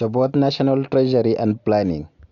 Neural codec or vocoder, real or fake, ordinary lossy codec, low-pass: none; real; none; 7.2 kHz